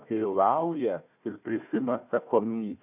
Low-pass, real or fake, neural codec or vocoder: 3.6 kHz; fake; codec, 16 kHz, 1 kbps, FunCodec, trained on Chinese and English, 50 frames a second